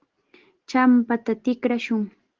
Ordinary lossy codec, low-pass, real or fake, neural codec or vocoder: Opus, 16 kbps; 7.2 kHz; real; none